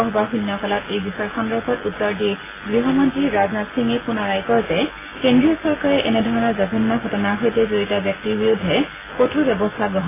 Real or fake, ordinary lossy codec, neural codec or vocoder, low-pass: real; AAC, 16 kbps; none; 3.6 kHz